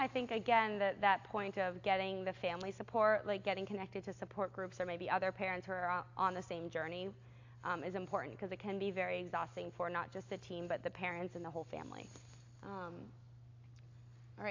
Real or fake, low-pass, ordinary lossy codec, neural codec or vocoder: real; 7.2 kHz; AAC, 48 kbps; none